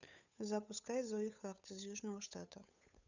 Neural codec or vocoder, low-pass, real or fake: codec, 16 kHz, 8 kbps, FunCodec, trained on Chinese and English, 25 frames a second; 7.2 kHz; fake